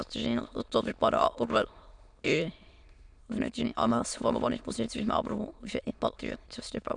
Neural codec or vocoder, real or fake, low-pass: autoencoder, 22.05 kHz, a latent of 192 numbers a frame, VITS, trained on many speakers; fake; 9.9 kHz